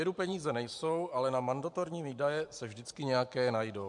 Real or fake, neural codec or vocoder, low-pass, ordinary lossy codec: fake; vocoder, 44.1 kHz, 128 mel bands every 512 samples, BigVGAN v2; 10.8 kHz; MP3, 64 kbps